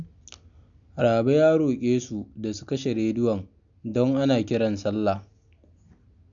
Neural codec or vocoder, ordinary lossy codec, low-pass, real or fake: none; none; 7.2 kHz; real